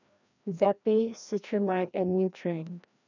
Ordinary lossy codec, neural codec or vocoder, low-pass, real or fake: none; codec, 16 kHz, 1 kbps, FreqCodec, larger model; 7.2 kHz; fake